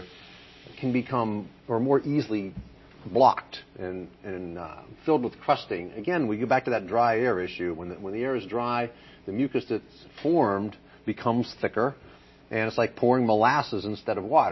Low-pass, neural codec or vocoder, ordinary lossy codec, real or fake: 7.2 kHz; none; MP3, 24 kbps; real